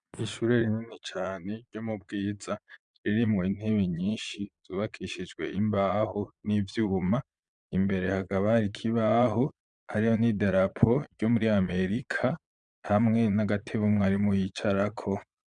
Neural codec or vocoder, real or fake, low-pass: vocoder, 22.05 kHz, 80 mel bands, Vocos; fake; 9.9 kHz